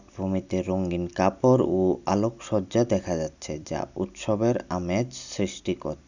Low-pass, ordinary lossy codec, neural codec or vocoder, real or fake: 7.2 kHz; none; none; real